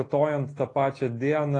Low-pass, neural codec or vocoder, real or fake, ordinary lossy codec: 10.8 kHz; none; real; AAC, 32 kbps